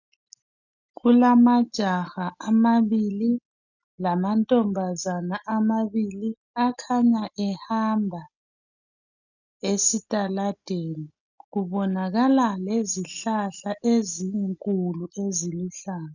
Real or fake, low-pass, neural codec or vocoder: real; 7.2 kHz; none